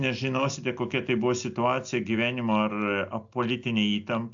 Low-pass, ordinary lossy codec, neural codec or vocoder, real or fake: 7.2 kHz; AAC, 64 kbps; none; real